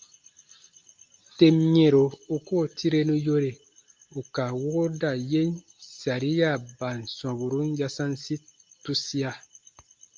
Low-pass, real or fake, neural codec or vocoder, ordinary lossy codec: 7.2 kHz; real; none; Opus, 24 kbps